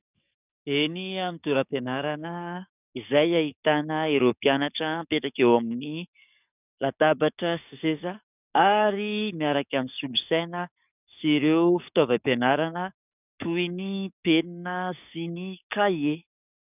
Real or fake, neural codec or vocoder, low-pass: fake; codec, 44.1 kHz, 7.8 kbps, DAC; 3.6 kHz